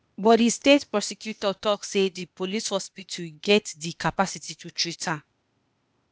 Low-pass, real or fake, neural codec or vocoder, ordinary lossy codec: none; fake; codec, 16 kHz, 0.8 kbps, ZipCodec; none